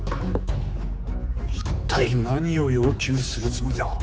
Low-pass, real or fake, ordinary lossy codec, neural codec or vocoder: none; fake; none; codec, 16 kHz, 4 kbps, X-Codec, HuBERT features, trained on general audio